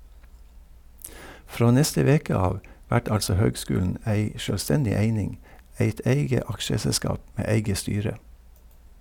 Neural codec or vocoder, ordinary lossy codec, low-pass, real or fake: none; none; 19.8 kHz; real